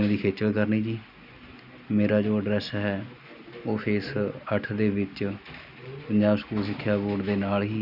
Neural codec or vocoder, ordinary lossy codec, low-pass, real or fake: none; none; 5.4 kHz; real